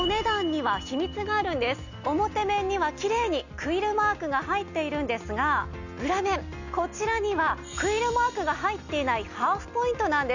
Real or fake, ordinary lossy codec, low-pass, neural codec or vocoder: real; none; 7.2 kHz; none